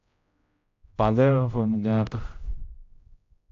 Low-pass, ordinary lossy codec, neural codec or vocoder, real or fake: 7.2 kHz; AAC, 48 kbps; codec, 16 kHz, 0.5 kbps, X-Codec, HuBERT features, trained on general audio; fake